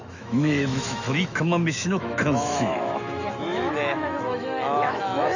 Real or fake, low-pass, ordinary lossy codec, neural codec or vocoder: fake; 7.2 kHz; none; autoencoder, 48 kHz, 128 numbers a frame, DAC-VAE, trained on Japanese speech